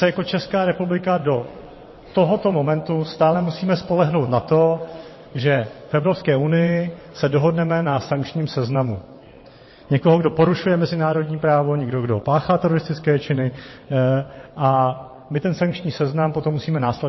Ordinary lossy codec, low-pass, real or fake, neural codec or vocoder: MP3, 24 kbps; 7.2 kHz; fake; vocoder, 22.05 kHz, 80 mel bands, WaveNeXt